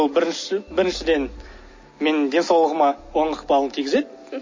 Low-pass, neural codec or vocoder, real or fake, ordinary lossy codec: 7.2 kHz; none; real; MP3, 32 kbps